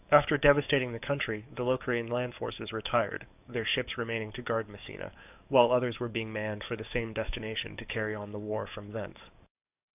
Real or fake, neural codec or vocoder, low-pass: fake; codec, 44.1 kHz, 7.8 kbps, DAC; 3.6 kHz